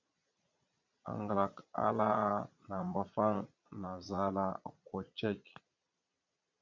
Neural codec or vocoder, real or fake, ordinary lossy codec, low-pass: vocoder, 22.05 kHz, 80 mel bands, Vocos; fake; MP3, 64 kbps; 7.2 kHz